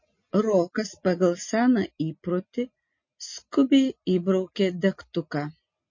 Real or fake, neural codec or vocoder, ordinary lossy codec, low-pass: real; none; MP3, 32 kbps; 7.2 kHz